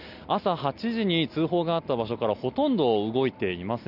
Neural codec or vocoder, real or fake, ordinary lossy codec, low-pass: none; real; none; 5.4 kHz